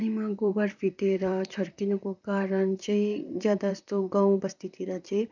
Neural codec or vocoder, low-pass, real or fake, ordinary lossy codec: vocoder, 44.1 kHz, 128 mel bands, Pupu-Vocoder; 7.2 kHz; fake; none